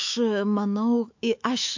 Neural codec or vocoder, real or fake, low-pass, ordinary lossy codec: autoencoder, 48 kHz, 128 numbers a frame, DAC-VAE, trained on Japanese speech; fake; 7.2 kHz; MP3, 48 kbps